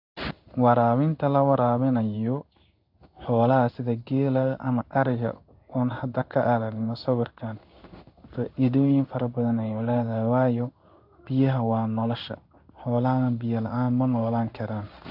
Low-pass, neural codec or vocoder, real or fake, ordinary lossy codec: 5.4 kHz; codec, 16 kHz in and 24 kHz out, 1 kbps, XY-Tokenizer; fake; AAC, 48 kbps